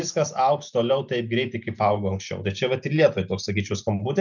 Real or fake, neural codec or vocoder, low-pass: real; none; 7.2 kHz